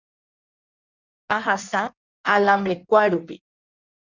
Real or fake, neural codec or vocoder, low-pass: fake; codec, 16 kHz in and 24 kHz out, 1.1 kbps, FireRedTTS-2 codec; 7.2 kHz